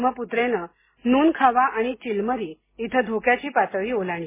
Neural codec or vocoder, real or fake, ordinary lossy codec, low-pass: none; real; MP3, 16 kbps; 3.6 kHz